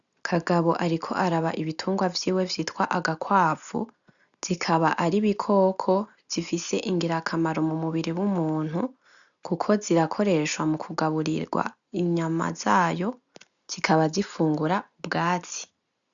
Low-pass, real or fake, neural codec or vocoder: 7.2 kHz; real; none